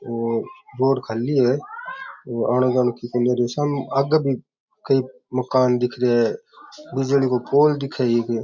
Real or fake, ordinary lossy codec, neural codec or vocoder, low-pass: real; MP3, 64 kbps; none; 7.2 kHz